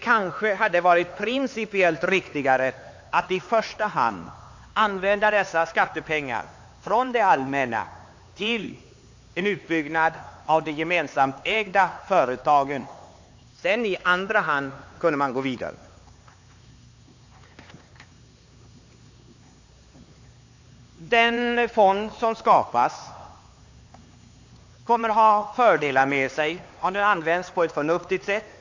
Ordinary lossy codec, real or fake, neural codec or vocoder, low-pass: AAC, 48 kbps; fake; codec, 16 kHz, 4 kbps, X-Codec, HuBERT features, trained on LibriSpeech; 7.2 kHz